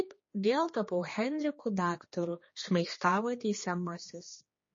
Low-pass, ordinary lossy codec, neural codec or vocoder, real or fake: 7.2 kHz; MP3, 32 kbps; codec, 16 kHz, 2 kbps, X-Codec, HuBERT features, trained on general audio; fake